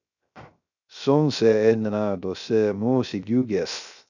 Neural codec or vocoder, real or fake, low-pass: codec, 16 kHz, 0.3 kbps, FocalCodec; fake; 7.2 kHz